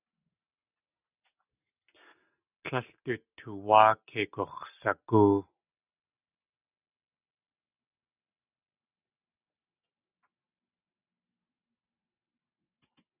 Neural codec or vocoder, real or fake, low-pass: none; real; 3.6 kHz